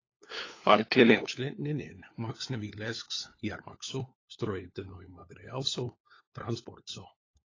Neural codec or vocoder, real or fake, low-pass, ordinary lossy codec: codec, 16 kHz, 4 kbps, FunCodec, trained on LibriTTS, 50 frames a second; fake; 7.2 kHz; AAC, 32 kbps